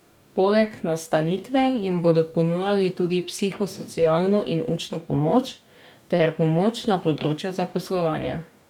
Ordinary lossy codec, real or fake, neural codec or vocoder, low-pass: none; fake; codec, 44.1 kHz, 2.6 kbps, DAC; 19.8 kHz